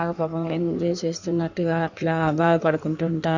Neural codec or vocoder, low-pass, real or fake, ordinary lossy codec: codec, 16 kHz in and 24 kHz out, 1.1 kbps, FireRedTTS-2 codec; 7.2 kHz; fake; none